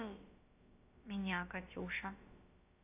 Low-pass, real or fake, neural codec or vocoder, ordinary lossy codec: 3.6 kHz; fake; codec, 16 kHz, about 1 kbps, DyCAST, with the encoder's durations; AAC, 24 kbps